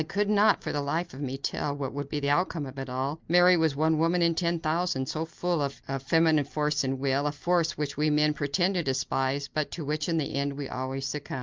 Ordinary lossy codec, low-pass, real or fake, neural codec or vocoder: Opus, 24 kbps; 7.2 kHz; real; none